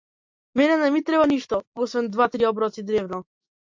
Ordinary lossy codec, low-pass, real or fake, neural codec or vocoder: MP3, 48 kbps; 7.2 kHz; real; none